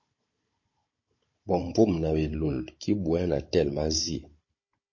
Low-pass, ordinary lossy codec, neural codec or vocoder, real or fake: 7.2 kHz; MP3, 32 kbps; codec, 16 kHz, 16 kbps, FunCodec, trained on Chinese and English, 50 frames a second; fake